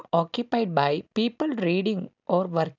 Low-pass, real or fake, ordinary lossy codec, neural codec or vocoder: 7.2 kHz; real; none; none